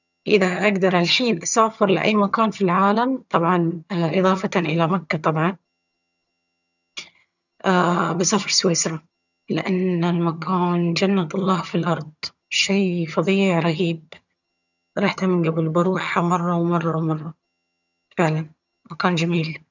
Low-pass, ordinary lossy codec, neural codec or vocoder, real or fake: 7.2 kHz; none; vocoder, 22.05 kHz, 80 mel bands, HiFi-GAN; fake